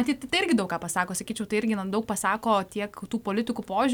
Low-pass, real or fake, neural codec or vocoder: 19.8 kHz; real; none